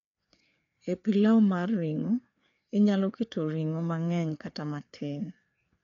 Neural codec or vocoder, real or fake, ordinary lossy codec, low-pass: codec, 16 kHz, 4 kbps, FreqCodec, larger model; fake; none; 7.2 kHz